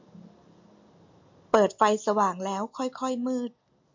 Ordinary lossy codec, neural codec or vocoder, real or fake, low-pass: MP3, 48 kbps; none; real; 7.2 kHz